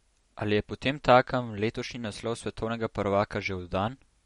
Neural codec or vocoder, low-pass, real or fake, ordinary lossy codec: none; 19.8 kHz; real; MP3, 48 kbps